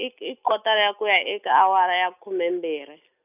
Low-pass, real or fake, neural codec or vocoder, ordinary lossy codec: 3.6 kHz; real; none; none